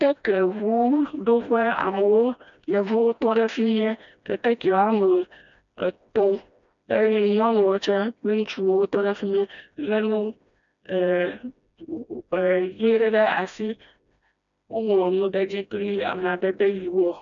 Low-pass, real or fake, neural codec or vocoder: 7.2 kHz; fake; codec, 16 kHz, 1 kbps, FreqCodec, smaller model